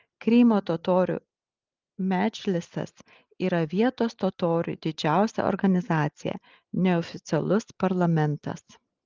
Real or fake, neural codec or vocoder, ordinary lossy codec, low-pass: real; none; Opus, 24 kbps; 7.2 kHz